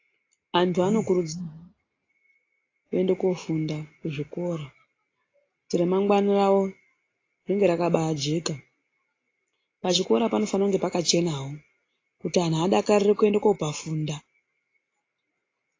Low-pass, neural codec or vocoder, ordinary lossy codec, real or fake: 7.2 kHz; none; AAC, 32 kbps; real